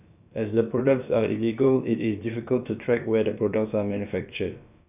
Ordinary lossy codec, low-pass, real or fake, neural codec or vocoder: none; 3.6 kHz; fake; codec, 16 kHz, about 1 kbps, DyCAST, with the encoder's durations